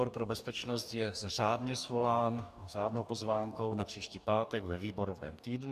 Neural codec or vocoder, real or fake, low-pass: codec, 44.1 kHz, 2.6 kbps, DAC; fake; 14.4 kHz